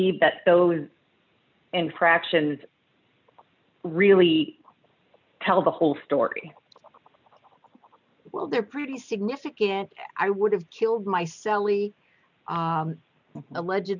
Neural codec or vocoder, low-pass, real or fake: none; 7.2 kHz; real